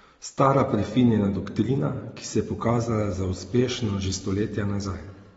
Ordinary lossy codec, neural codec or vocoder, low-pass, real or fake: AAC, 24 kbps; none; 9.9 kHz; real